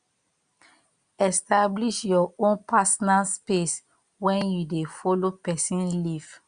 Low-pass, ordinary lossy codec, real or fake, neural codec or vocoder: 9.9 kHz; Opus, 64 kbps; real; none